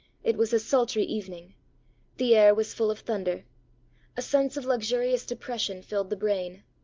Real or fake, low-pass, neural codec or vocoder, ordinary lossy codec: real; 7.2 kHz; none; Opus, 24 kbps